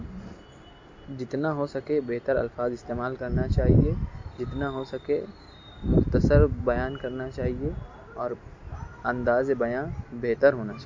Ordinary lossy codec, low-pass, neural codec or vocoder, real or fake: MP3, 48 kbps; 7.2 kHz; none; real